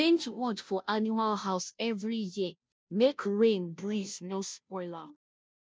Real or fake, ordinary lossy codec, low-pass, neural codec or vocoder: fake; none; none; codec, 16 kHz, 0.5 kbps, FunCodec, trained on Chinese and English, 25 frames a second